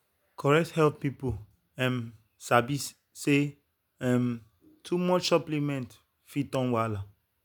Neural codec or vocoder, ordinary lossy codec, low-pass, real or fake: none; none; none; real